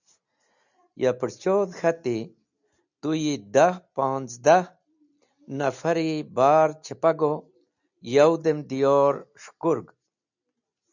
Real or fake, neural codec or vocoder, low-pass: real; none; 7.2 kHz